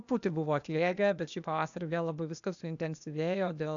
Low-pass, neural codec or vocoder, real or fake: 7.2 kHz; codec, 16 kHz, 0.8 kbps, ZipCodec; fake